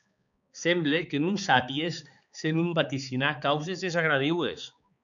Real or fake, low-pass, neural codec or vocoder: fake; 7.2 kHz; codec, 16 kHz, 4 kbps, X-Codec, HuBERT features, trained on balanced general audio